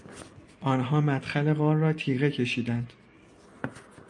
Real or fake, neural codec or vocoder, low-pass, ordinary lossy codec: real; none; 10.8 kHz; AAC, 64 kbps